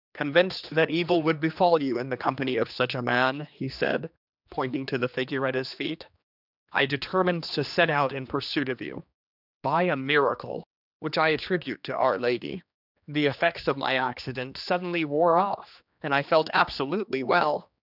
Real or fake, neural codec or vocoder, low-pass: fake; codec, 16 kHz, 2 kbps, X-Codec, HuBERT features, trained on general audio; 5.4 kHz